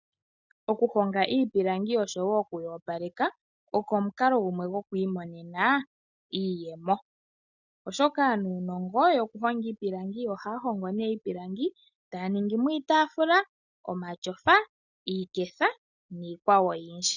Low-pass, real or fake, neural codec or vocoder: 7.2 kHz; real; none